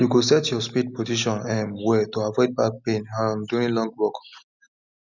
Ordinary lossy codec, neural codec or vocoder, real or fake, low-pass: none; none; real; 7.2 kHz